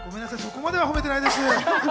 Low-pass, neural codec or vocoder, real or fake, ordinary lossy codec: none; none; real; none